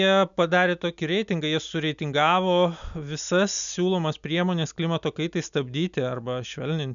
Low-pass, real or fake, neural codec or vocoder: 7.2 kHz; real; none